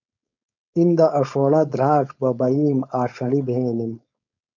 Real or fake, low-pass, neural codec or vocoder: fake; 7.2 kHz; codec, 16 kHz, 4.8 kbps, FACodec